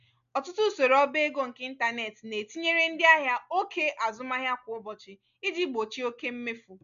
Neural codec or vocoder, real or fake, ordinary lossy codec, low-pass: none; real; MP3, 96 kbps; 7.2 kHz